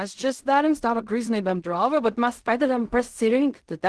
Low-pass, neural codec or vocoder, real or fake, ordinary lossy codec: 10.8 kHz; codec, 16 kHz in and 24 kHz out, 0.4 kbps, LongCat-Audio-Codec, fine tuned four codebook decoder; fake; Opus, 16 kbps